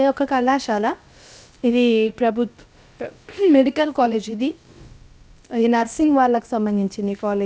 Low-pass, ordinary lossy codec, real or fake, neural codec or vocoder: none; none; fake; codec, 16 kHz, about 1 kbps, DyCAST, with the encoder's durations